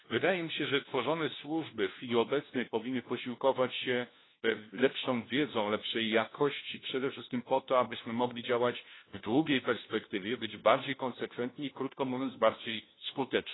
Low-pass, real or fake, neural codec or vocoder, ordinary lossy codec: 7.2 kHz; fake; codec, 16 kHz, 1 kbps, FunCodec, trained on LibriTTS, 50 frames a second; AAC, 16 kbps